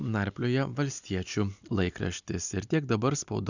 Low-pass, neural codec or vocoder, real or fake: 7.2 kHz; none; real